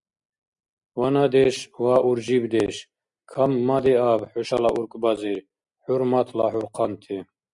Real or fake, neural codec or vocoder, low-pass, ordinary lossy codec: real; none; 10.8 kHz; Opus, 64 kbps